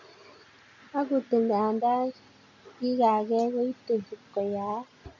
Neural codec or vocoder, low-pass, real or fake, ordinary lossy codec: none; 7.2 kHz; real; MP3, 48 kbps